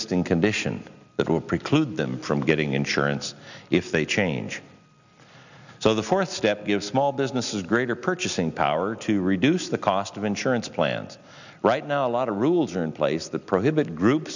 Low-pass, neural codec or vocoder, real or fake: 7.2 kHz; none; real